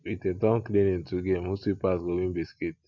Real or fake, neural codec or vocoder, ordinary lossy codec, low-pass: real; none; none; 7.2 kHz